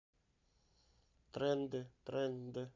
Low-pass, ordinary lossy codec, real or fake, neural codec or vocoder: 7.2 kHz; MP3, 64 kbps; fake; vocoder, 44.1 kHz, 128 mel bands every 512 samples, BigVGAN v2